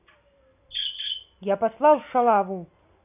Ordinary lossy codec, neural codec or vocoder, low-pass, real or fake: none; none; 3.6 kHz; real